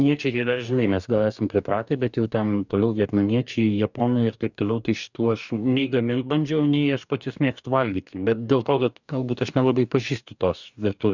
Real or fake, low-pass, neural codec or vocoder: fake; 7.2 kHz; codec, 44.1 kHz, 2.6 kbps, DAC